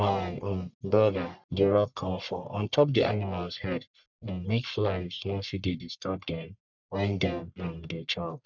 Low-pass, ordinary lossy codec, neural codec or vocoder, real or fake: 7.2 kHz; none; codec, 44.1 kHz, 1.7 kbps, Pupu-Codec; fake